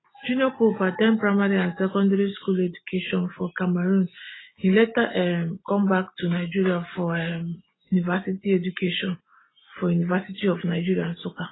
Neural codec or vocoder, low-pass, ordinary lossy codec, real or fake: none; 7.2 kHz; AAC, 16 kbps; real